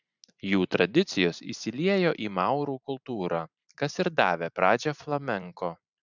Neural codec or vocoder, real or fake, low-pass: vocoder, 44.1 kHz, 128 mel bands every 512 samples, BigVGAN v2; fake; 7.2 kHz